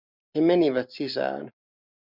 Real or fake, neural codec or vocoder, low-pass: real; none; 5.4 kHz